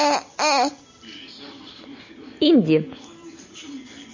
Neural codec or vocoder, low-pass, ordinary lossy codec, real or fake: none; 7.2 kHz; MP3, 32 kbps; real